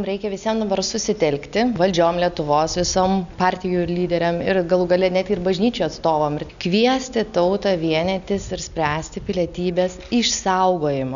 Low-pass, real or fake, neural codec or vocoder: 7.2 kHz; real; none